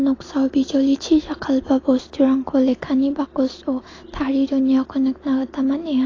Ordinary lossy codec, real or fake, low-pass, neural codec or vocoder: AAC, 32 kbps; real; 7.2 kHz; none